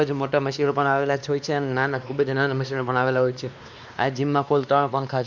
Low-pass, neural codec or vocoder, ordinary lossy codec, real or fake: 7.2 kHz; codec, 16 kHz, 2 kbps, X-Codec, WavLM features, trained on Multilingual LibriSpeech; none; fake